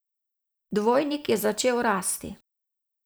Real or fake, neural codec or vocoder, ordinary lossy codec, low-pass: fake; vocoder, 44.1 kHz, 128 mel bands every 512 samples, BigVGAN v2; none; none